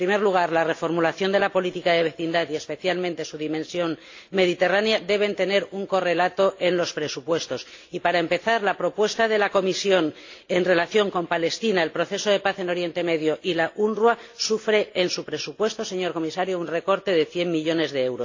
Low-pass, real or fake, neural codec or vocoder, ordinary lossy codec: 7.2 kHz; real; none; AAC, 48 kbps